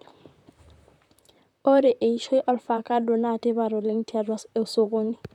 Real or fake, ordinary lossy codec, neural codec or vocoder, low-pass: fake; none; vocoder, 44.1 kHz, 128 mel bands, Pupu-Vocoder; 19.8 kHz